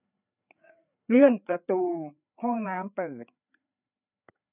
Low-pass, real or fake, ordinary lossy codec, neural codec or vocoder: 3.6 kHz; fake; none; codec, 16 kHz, 4 kbps, FreqCodec, larger model